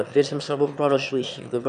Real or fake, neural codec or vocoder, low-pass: fake; autoencoder, 22.05 kHz, a latent of 192 numbers a frame, VITS, trained on one speaker; 9.9 kHz